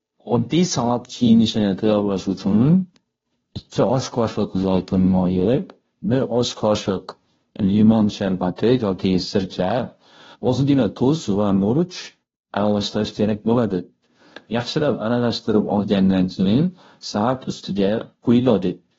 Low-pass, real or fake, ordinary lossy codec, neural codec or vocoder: 7.2 kHz; fake; AAC, 24 kbps; codec, 16 kHz, 0.5 kbps, FunCodec, trained on Chinese and English, 25 frames a second